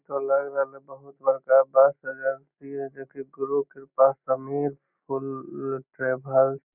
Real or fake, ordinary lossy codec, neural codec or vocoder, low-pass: real; none; none; 3.6 kHz